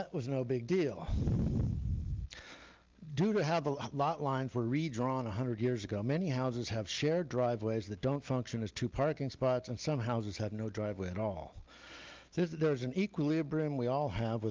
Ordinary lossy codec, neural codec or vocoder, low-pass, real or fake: Opus, 32 kbps; none; 7.2 kHz; real